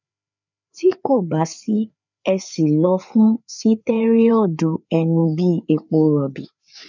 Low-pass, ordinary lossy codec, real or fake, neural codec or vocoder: 7.2 kHz; none; fake; codec, 16 kHz, 4 kbps, FreqCodec, larger model